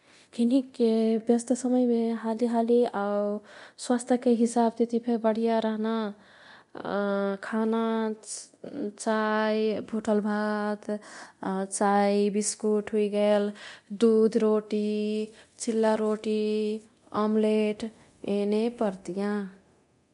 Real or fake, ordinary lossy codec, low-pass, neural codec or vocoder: fake; MP3, 64 kbps; 10.8 kHz; codec, 24 kHz, 0.9 kbps, DualCodec